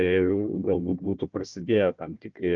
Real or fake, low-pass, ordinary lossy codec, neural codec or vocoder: fake; 7.2 kHz; Opus, 24 kbps; codec, 16 kHz, 1 kbps, FunCodec, trained on Chinese and English, 50 frames a second